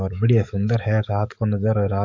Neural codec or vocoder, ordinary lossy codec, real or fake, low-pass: codec, 44.1 kHz, 7.8 kbps, DAC; MP3, 48 kbps; fake; 7.2 kHz